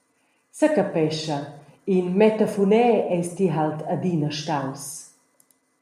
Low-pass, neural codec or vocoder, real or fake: 14.4 kHz; none; real